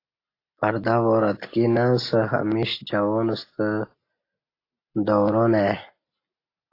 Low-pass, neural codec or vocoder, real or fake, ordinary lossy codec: 5.4 kHz; none; real; AAC, 32 kbps